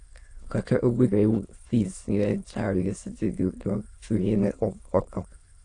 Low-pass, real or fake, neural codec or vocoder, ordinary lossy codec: 9.9 kHz; fake; autoencoder, 22.05 kHz, a latent of 192 numbers a frame, VITS, trained on many speakers; AAC, 48 kbps